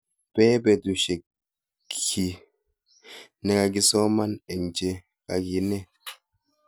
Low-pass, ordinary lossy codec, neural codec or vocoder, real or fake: none; none; none; real